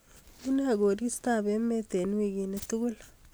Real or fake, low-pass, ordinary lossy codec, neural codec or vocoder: real; none; none; none